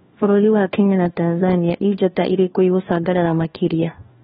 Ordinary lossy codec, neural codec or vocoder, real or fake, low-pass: AAC, 16 kbps; codec, 16 kHz, 1 kbps, FunCodec, trained on LibriTTS, 50 frames a second; fake; 7.2 kHz